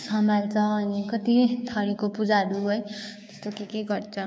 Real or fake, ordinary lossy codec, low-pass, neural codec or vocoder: fake; none; none; codec, 16 kHz, 6 kbps, DAC